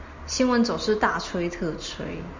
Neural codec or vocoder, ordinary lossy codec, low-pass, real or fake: none; MP3, 64 kbps; 7.2 kHz; real